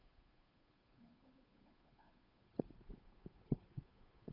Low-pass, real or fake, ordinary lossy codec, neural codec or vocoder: 5.4 kHz; fake; none; codec, 16 kHz, 8 kbps, FunCodec, trained on Chinese and English, 25 frames a second